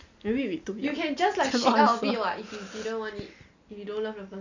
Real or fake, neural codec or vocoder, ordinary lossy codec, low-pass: real; none; none; 7.2 kHz